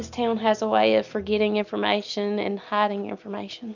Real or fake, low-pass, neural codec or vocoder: real; 7.2 kHz; none